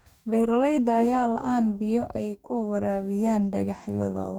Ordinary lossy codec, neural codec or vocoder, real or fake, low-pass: none; codec, 44.1 kHz, 2.6 kbps, DAC; fake; 19.8 kHz